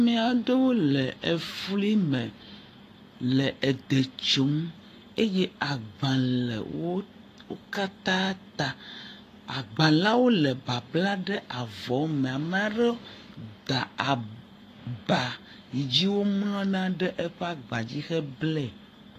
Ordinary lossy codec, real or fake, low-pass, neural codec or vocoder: AAC, 48 kbps; fake; 14.4 kHz; codec, 44.1 kHz, 7.8 kbps, Pupu-Codec